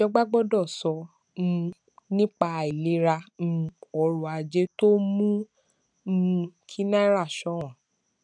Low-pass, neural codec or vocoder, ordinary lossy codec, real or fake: 9.9 kHz; none; none; real